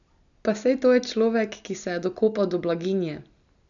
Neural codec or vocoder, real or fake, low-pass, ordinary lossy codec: none; real; 7.2 kHz; none